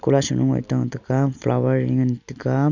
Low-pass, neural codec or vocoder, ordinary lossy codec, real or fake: 7.2 kHz; none; none; real